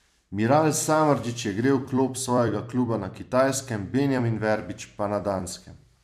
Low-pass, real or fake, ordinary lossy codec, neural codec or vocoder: 14.4 kHz; fake; none; vocoder, 44.1 kHz, 128 mel bands every 256 samples, BigVGAN v2